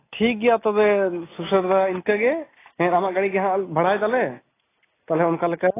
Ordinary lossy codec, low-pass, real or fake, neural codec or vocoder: AAC, 16 kbps; 3.6 kHz; real; none